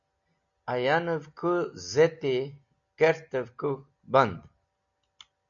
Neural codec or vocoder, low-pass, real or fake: none; 7.2 kHz; real